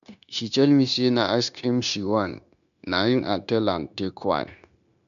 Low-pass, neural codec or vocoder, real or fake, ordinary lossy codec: 7.2 kHz; codec, 16 kHz, 0.9 kbps, LongCat-Audio-Codec; fake; none